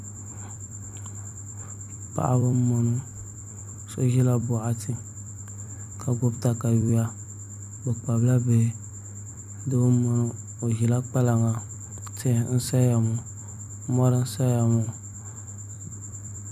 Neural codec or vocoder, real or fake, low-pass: vocoder, 44.1 kHz, 128 mel bands every 256 samples, BigVGAN v2; fake; 14.4 kHz